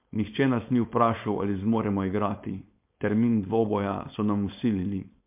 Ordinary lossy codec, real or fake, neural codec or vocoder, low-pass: MP3, 32 kbps; fake; codec, 16 kHz, 4.8 kbps, FACodec; 3.6 kHz